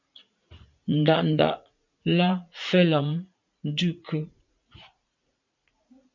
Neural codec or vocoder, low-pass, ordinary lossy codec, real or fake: vocoder, 44.1 kHz, 80 mel bands, Vocos; 7.2 kHz; MP3, 64 kbps; fake